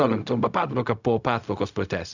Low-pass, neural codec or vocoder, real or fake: 7.2 kHz; codec, 16 kHz, 0.4 kbps, LongCat-Audio-Codec; fake